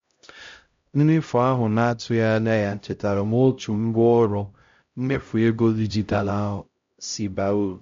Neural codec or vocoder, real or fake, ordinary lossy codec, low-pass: codec, 16 kHz, 0.5 kbps, X-Codec, HuBERT features, trained on LibriSpeech; fake; MP3, 48 kbps; 7.2 kHz